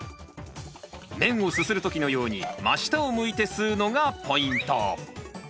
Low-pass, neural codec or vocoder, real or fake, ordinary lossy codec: none; none; real; none